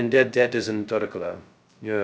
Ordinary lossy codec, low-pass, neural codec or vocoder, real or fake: none; none; codec, 16 kHz, 0.2 kbps, FocalCodec; fake